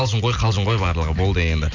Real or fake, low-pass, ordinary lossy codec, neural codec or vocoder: real; 7.2 kHz; none; none